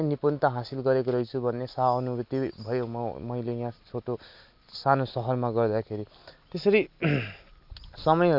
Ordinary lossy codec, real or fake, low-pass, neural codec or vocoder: MP3, 48 kbps; real; 5.4 kHz; none